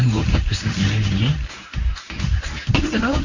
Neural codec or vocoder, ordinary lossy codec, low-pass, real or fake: codec, 24 kHz, 0.9 kbps, WavTokenizer, medium speech release version 1; none; 7.2 kHz; fake